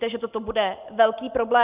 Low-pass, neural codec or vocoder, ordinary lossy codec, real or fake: 3.6 kHz; none; Opus, 32 kbps; real